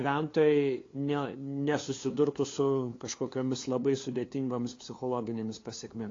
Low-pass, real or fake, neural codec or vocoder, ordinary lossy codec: 7.2 kHz; fake; codec, 16 kHz, 2 kbps, FunCodec, trained on LibriTTS, 25 frames a second; AAC, 32 kbps